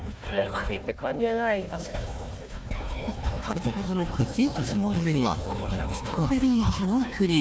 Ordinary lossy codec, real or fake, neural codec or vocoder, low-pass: none; fake; codec, 16 kHz, 1 kbps, FunCodec, trained on Chinese and English, 50 frames a second; none